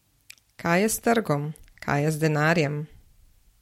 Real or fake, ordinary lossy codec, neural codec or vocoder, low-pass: real; MP3, 64 kbps; none; 19.8 kHz